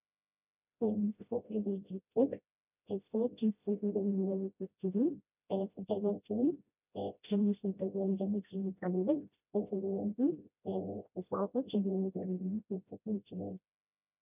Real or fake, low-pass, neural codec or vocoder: fake; 3.6 kHz; codec, 16 kHz, 0.5 kbps, FreqCodec, smaller model